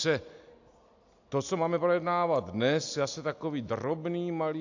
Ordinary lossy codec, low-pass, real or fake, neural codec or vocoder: AAC, 48 kbps; 7.2 kHz; real; none